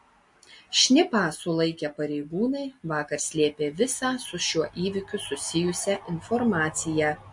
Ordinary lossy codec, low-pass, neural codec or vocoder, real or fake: MP3, 48 kbps; 10.8 kHz; none; real